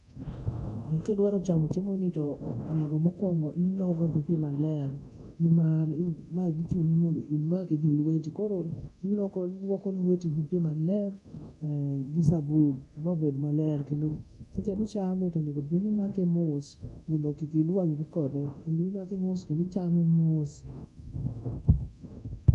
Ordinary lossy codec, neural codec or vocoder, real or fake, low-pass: none; codec, 24 kHz, 0.9 kbps, DualCodec; fake; 10.8 kHz